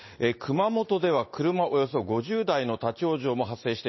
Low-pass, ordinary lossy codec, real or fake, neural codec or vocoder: 7.2 kHz; MP3, 24 kbps; real; none